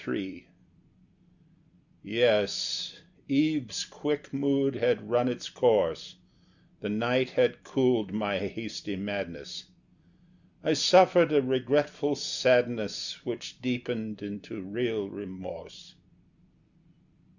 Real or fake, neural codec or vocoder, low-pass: real; none; 7.2 kHz